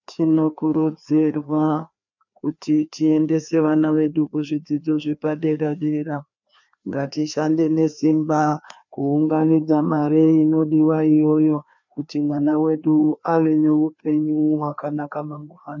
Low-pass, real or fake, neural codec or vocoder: 7.2 kHz; fake; codec, 16 kHz, 2 kbps, FreqCodec, larger model